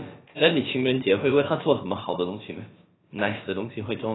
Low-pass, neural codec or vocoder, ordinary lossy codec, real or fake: 7.2 kHz; codec, 16 kHz, about 1 kbps, DyCAST, with the encoder's durations; AAC, 16 kbps; fake